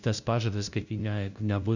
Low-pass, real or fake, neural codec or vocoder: 7.2 kHz; fake; codec, 16 kHz, 0.5 kbps, FunCodec, trained on LibriTTS, 25 frames a second